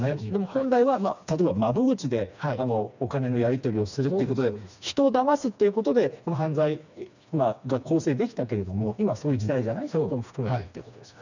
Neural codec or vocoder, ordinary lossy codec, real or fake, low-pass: codec, 16 kHz, 2 kbps, FreqCodec, smaller model; none; fake; 7.2 kHz